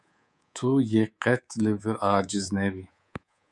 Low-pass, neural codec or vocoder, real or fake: 10.8 kHz; codec, 24 kHz, 3.1 kbps, DualCodec; fake